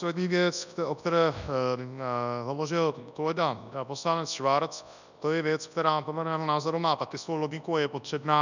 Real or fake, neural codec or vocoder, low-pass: fake; codec, 24 kHz, 0.9 kbps, WavTokenizer, large speech release; 7.2 kHz